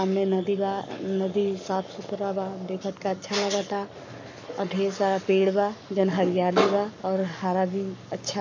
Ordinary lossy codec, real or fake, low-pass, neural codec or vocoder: AAC, 48 kbps; fake; 7.2 kHz; codec, 44.1 kHz, 7.8 kbps, Pupu-Codec